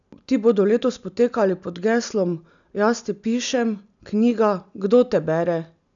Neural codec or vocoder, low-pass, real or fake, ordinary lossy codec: none; 7.2 kHz; real; MP3, 96 kbps